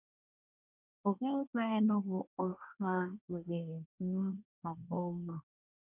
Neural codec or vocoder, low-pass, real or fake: codec, 24 kHz, 1 kbps, SNAC; 3.6 kHz; fake